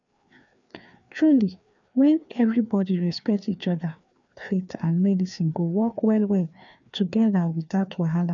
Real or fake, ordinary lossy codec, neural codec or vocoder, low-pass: fake; none; codec, 16 kHz, 2 kbps, FreqCodec, larger model; 7.2 kHz